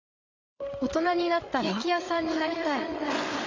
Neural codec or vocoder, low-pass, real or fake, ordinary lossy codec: codec, 16 kHz, 8 kbps, FreqCodec, larger model; 7.2 kHz; fake; AAC, 32 kbps